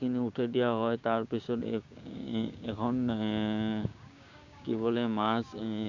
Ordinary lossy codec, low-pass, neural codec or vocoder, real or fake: Opus, 64 kbps; 7.2 kHz; codec, 16 kHz, 6 kbps, DAC; fake